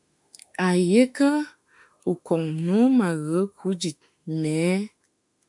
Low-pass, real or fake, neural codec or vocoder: 10.8 kHz; fake; autoencoder, 48 kHz, 32 numbers a frame, DAC-VAE, trained on Japanese speech